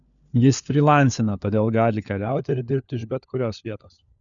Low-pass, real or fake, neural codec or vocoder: 7.2 kHz; fake; codec, 16 kHz, 4 kbps, FunCodec, trained on LibriTTS, 50 frames a second